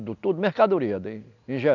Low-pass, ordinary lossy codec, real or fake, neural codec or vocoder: 7.2 kHz; none; real; none